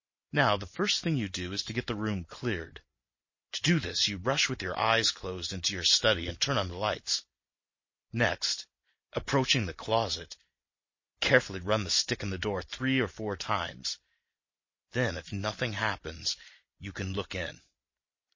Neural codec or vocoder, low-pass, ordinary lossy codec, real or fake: none; 7.2 kHz; MP3, 32 kbps; real